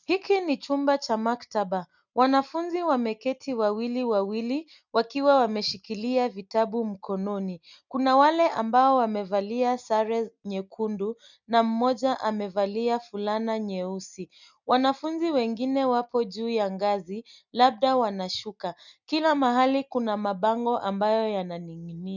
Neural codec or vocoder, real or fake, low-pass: none; real; 7.2 kHz